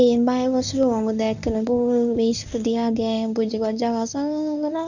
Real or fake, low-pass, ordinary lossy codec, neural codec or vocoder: fake; 7.2 kHz; none; codec, 24 kHz, 0.9 kbps, WavTokenizer, medium speech release version 1